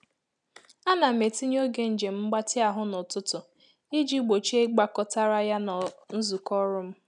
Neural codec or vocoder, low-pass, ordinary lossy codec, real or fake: none; 10.8 kHz; none; real